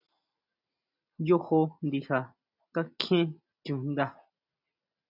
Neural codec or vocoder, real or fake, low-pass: none; real; 5.4 kHz